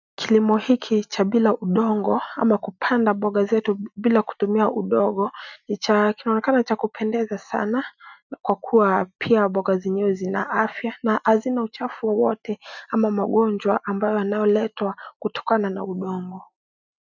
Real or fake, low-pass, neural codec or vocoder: real; 7.2 kHz; none